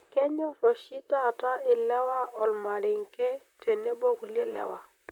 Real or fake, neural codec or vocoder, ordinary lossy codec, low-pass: fake; vocoder, 44.1 kHz, 128 mel bands, Pupu-Vocoder; none; 19.8 kHz